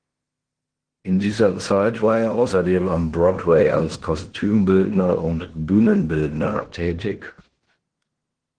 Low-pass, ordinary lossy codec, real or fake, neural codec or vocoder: 9.9 kHz; Opus, 16 kbps; fake; codec, 16 kHz in and 24 kHz out, 0.9 kbps, LongCat-Audio-Codec, fine tuned four codebook decoder